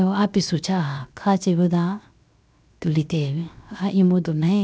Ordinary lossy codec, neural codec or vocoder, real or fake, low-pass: none; codec, 16 kHz, 0.7 kbps, FocalCodec; fake; none